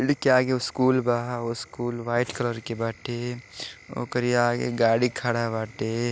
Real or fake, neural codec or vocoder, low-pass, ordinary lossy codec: real; none; none; none